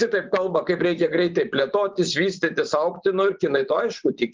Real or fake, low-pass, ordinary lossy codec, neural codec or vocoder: fake; 7.2 kHz; Opus, 32 kbps; vocoder, 24 kHz, 100 mel bands, Vocos